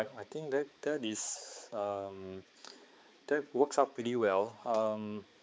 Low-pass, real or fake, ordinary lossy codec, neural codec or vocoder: none; fake; none; codec, 16 kHz, 4 kbps, X-Codec, HuBERT features, trained on balanced general audio